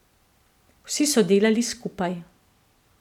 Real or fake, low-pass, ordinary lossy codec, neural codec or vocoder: real; 19.8 kHz; none; none